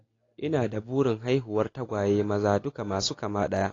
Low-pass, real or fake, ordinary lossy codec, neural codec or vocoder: 7.2 kHz; real; AAC, 32 kbps; none